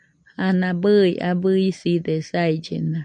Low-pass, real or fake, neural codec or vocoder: 9.9 kHz; real; none